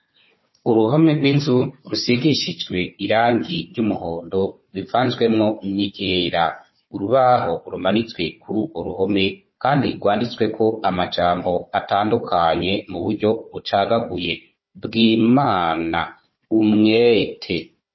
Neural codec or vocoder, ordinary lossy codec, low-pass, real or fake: codec, 16 kHz, 4 kbps, FunCodec, trained on Chinese and English, 50 frames a second; MP3, 24 kbps; 7.2 kHz; fake